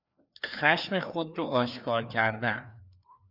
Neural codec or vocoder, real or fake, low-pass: codec, 16 kHz, 2 kbps, FreqCodec, larger model; fake; 5.4 kHz